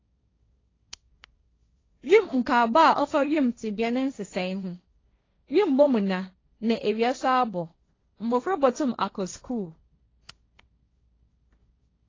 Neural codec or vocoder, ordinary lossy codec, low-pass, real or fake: codec, 16 kHz, 1.1 kbps, Voila-Tokenizer; AAC, 32 kbps; 7.2 kHz; fake